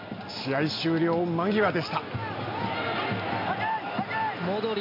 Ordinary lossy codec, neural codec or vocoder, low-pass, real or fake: MP3, 32 kbps; none; 5.4 kHz; real